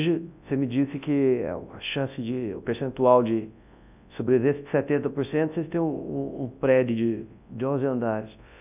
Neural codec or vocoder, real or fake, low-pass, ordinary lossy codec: codec, 24 kHz, 0.9 kbps, WavTokenizer, large speech release; fake; 3.6 kHz; none